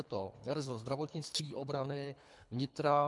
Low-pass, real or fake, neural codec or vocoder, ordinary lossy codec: 10.8 kHz; fake; codec, 24 kHz, 3 kbps, HILCodec; MP3, 96 kbps